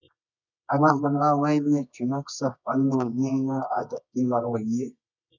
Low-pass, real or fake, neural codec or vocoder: 7.2 kHz; fake; codec, 24 kHz, 0.9 kbps, WavTokenizer, medium music audio release